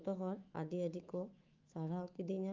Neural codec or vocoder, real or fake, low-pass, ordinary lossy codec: autoencoder, 48 kHz, 128 numbers a frame, DAC-VAE, trained on Japanese speech; fake; 7.2 kHz; Opus, 24 kbps